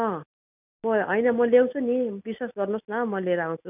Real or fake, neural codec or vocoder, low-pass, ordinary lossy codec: real; none; 3.6 kHz; none